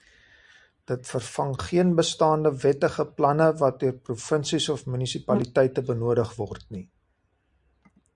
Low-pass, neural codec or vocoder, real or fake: 10.8 kHz; none; real